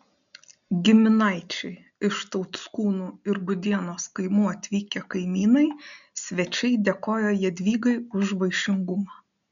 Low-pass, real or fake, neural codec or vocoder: 7.2 kHz; real; none